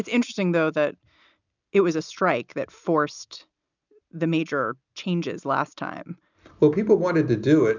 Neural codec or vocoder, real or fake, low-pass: none; real; 7.2 kHz